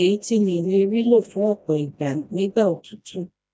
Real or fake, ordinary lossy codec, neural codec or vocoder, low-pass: fake; none; codec, 16 kHz, 1 kbps, FreqCodec, smaller model; none